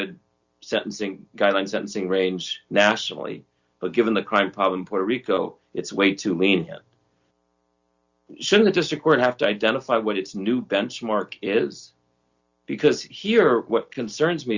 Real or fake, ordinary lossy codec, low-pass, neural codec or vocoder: real; Opus, 64 kbps; 7.2 kHz; none